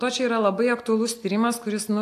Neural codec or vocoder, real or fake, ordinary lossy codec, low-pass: none; real; AAC, 64 kbps; 14.4 kHz